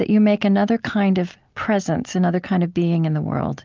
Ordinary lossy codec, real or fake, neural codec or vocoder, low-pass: Opus, 32 kbps; real; none; 7.2 kHz